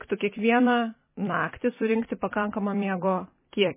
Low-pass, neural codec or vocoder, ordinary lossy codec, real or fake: 3.6 kHz; vocoder, 44.1 kHz, 128 mel bands every 256 samples, BigVGAN v2; MP3, 16 kbps; fake